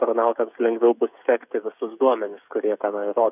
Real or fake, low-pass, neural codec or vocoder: fake; 3.6 kHz; codec, 16 kHz, 16 kbps, FreqCodec, smaller model